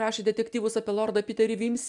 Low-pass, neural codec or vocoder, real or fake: 10.8 kHz; none; real